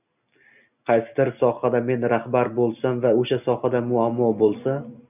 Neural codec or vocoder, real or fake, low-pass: none; real; 3.6 kHz